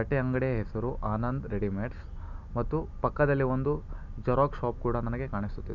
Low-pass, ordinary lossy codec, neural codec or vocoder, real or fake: 7.2 kHz; none; none; real